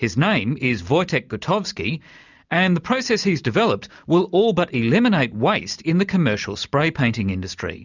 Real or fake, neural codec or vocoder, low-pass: real; none; 7.2 kHz